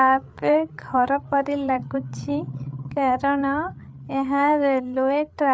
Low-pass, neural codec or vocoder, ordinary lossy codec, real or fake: none; codec, 16 kHz, 8 kbps, FreqCodec, larger model; none; fake